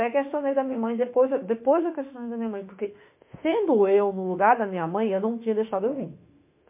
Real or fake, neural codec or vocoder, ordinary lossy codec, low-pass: fake; autoencoder, 48 kHz, 32 numbers a frame, DAC-VAE, trained on Japanese speech; MP3, 24 kbps; 3.6 kHz